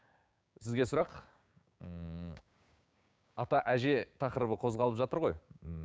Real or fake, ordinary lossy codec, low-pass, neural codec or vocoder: fake; none; none; codec, 16 kHz, 6 kbps, DAC